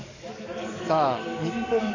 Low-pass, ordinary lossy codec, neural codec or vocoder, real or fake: 7.2 kHz; AAC, 48 kbps; codec, 44.1 kHz, 7.8 kbps, DAC; fake